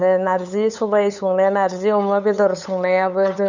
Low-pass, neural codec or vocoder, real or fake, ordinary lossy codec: 7.2 kHz; codec, 16 kHz, 8 kbps, FunCodec, trained on LibriTTS, 25 frames a second; fake; none